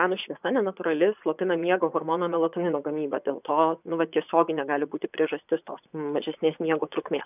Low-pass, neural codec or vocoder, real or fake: 3.6 kHz; vocoder, 24 kHz, 100 mel bands, Vocos; fake